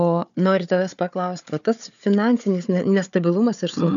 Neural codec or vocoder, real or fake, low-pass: codec, 16 kHz, 4 kbps, FunCodec, trained on Chinese and English, 50 frames a second; fake; 7.2 kHz